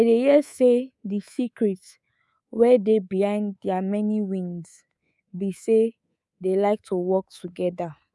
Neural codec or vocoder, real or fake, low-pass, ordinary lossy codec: codec, 24 kHz, 3.1 kbps, DualCodec; fake; none; none